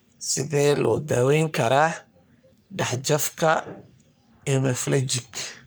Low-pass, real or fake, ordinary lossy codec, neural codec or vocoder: none; fake; none; codec, 44.1 kHz, 3.4 kbps, Pupu-Codec